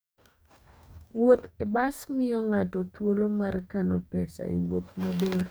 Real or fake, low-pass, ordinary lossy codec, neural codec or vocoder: fake; none; none; codec, 44.1 kHz, 2.6 kbps, DAC